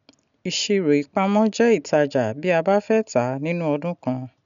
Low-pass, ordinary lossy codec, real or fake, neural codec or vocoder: 7.2 kHz; none; real; none